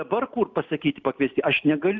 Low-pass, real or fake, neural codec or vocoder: 7.2 kHz; real; none